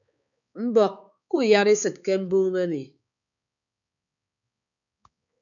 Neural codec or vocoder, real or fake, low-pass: codec, 16 kHz, 4 kbps, X-Codec, HuBERT features, trained on balanced general audio; fake; 7.2 kHz